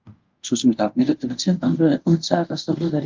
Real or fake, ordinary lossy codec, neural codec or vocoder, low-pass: fake; Opus, 24 kbps; codec, 24 kHz, 0.5 kbps, DualCodec; 7.2 kHz